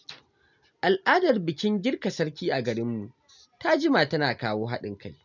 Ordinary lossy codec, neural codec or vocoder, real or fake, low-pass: none; none; real; 7.2 kHz